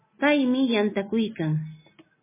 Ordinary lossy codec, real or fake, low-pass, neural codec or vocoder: MP3, 16 kbps; real; 3.6 kHz; none